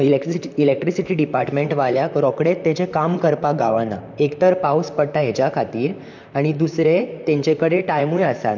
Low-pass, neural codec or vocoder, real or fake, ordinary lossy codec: 7.2 kHz; vocoder, 44.1 kHz, 128 mel bands, Pupu-Vocoder; fake; none